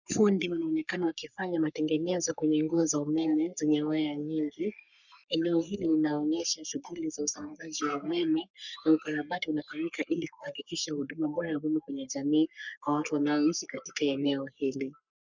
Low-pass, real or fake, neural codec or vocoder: 7.2 kHz; fake; codec, 44.1 kHz, 3.4 kbps, Pupu-Codec